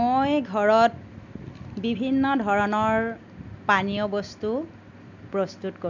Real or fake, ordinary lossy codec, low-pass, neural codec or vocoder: real; none; 7.2 kHz; none